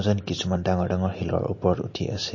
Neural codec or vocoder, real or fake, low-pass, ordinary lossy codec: none; real; 7.2 kHz; MP3, 32 kbps